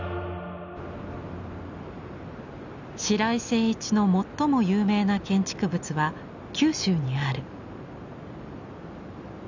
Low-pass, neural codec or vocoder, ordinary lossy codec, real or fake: 7.2 kHz; none; none; real